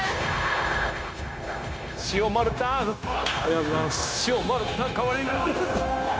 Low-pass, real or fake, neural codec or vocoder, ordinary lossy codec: none; fake; codec, 16 kHz, 0.9 kbps, LongCat-Audio-Codec; none